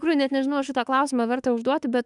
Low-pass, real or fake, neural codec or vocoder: 10.8 kHz; fake; autoencoder, 48 kHz, 32 numbers a frame, DAC-VAE, trained on Japanese speech